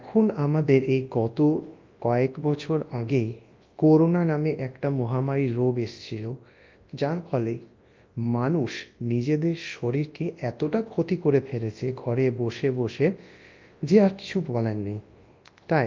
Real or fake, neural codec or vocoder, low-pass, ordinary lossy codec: fake; codec, 24 kHz, 0.9 kbps, WavTokenizer, large speech release; 7.2 kHz; Opus, 32 kbps